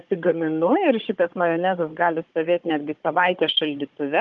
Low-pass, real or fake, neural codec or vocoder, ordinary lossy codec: 7.2 kHz; fake; codec, 16 kHz, 16 kbps, FunCodec, trained on Chinese and English, 50 frames a second; Opus, 32 kbps